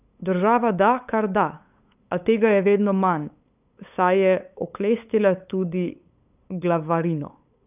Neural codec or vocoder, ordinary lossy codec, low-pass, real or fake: codec, 16 kHz, 8 kbps, FunCodec, trained on LibriTTS, 25 frames a second; none; 3.6 kHz; fake